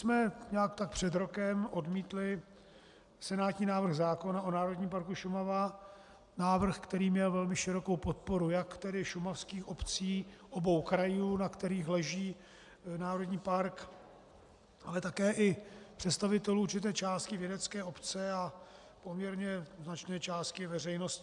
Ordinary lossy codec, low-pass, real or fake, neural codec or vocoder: MP3, 96 kbps; 10.8 kHz; real; none